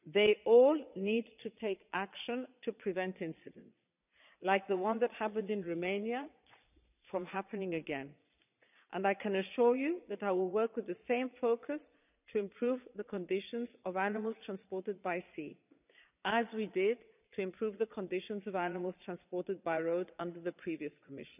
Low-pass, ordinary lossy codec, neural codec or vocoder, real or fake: 3.6 kHz; none; vocoder, 22.05 kHz, 80 mel bands, Vocos; fake